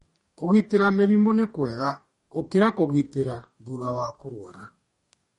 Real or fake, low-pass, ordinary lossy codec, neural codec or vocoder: fake; 19.8 kHz; MP3, 48 kbps; codec, 44.1 kHz, 2.6 kbps, DAC